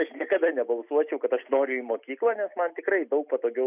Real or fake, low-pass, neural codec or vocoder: real; 3.6 kHz; none